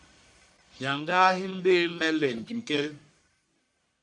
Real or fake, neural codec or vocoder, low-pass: fake; codec, 44.1 kHz, 1.7 kbps, Pupu-Codec; 10.8 kHz